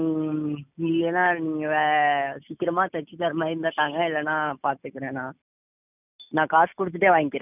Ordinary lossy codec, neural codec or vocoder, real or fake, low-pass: none; none; real; 3.6 kHz